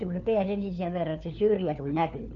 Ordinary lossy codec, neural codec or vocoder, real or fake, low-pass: none; codec, 16 kHz, 4 kbps, FunCodec, trained on LibriTTS, 50 frames a second; fake; 7.2 kHz